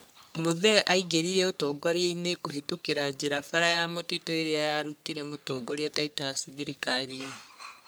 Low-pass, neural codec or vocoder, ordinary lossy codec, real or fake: none; codec, 44.1 kHz, 3.4 kbps, Pupu-Codec; none; fake